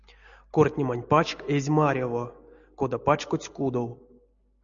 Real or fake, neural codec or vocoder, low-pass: real; none; 7.2 kHz